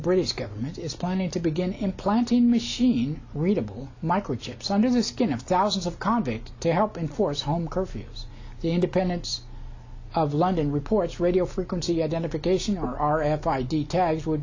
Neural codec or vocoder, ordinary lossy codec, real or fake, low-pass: none; AAC, 48 kbps; real; 7.2 kHz